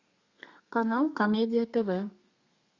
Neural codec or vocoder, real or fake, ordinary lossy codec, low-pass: codec, 32 kHz, 1.9 kbps, SNAC; fake; Opus, 64 kbps; 7.2 kHz